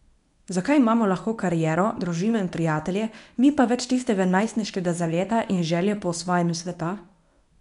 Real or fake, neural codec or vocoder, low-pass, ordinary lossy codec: fake; codec, 24 kHz, 0.9 kbps, WavTokenizer, medium speech release version 1; 10.8 kHz; none